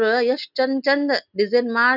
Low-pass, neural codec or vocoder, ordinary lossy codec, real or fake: 5.4 kHz; none; none; real